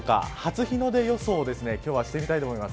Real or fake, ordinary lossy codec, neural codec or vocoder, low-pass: real; none; none; none